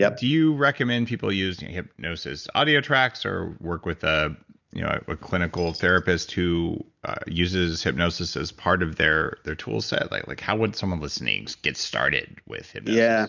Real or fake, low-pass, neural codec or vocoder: real; 7.2 kHz; none